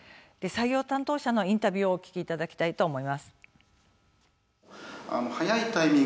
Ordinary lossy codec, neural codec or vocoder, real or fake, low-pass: none; none; real; none